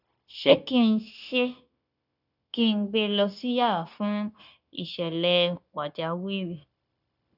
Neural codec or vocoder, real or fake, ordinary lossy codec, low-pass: codec, 16 kHz, 0.9 kbps, LongCat-Audio-Codec; fake; none; 5.4 kHz